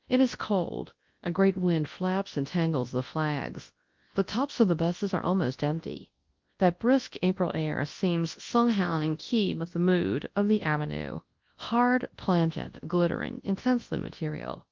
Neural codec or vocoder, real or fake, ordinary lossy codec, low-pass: codec, 24 kHz, 0.9 kbps, WavTokenizer, large speech release; fake; Opus, 32 kbps; 7.2 kHz